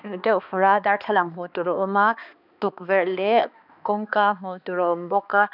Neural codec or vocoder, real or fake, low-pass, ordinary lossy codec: codec, 16 kHz, 2 kbps, X-Codec, HuBERT features, trained on balanced general audio; fake; 5.4 kHz; none